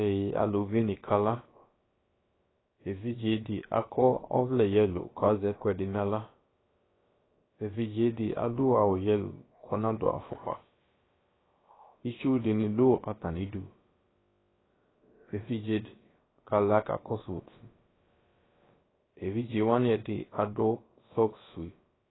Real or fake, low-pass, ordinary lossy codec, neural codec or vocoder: fake; 7.2 kHz; AAC, 16 kbps; codec, 16 kHz, about 1 kbps, DyCAST, with the encoder's durations